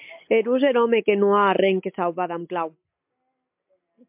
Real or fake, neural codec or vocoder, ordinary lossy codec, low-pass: real; none; MP3, 32 kbps; 3.6 kHz